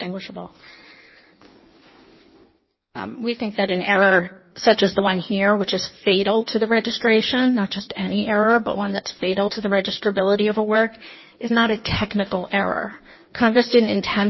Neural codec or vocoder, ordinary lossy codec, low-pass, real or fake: codec, 16 kHz in and 24 kHz out, 1.1 kbps, FireRedTTS-2 codec; MP3, 24 kbps; 7.2 kHz; fake